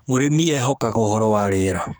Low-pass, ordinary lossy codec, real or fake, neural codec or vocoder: none; none; fake; codec, 44.1 kHz, 2.6 kbps, SNAC